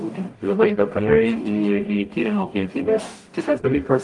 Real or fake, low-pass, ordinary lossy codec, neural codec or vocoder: fake; 10.8 kHz; Opus, 32 kbps; codec, 44.1 kHz, 0.9 kbps, DAC